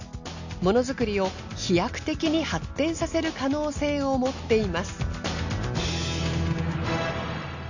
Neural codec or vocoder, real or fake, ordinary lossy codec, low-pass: none; real; none; 7.2 kHz